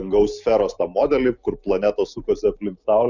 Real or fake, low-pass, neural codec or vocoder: real; 7.2 kHz; none